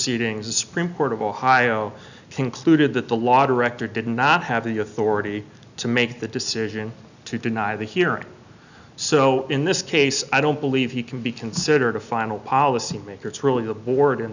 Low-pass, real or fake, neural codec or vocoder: 7.2 kHz; real; none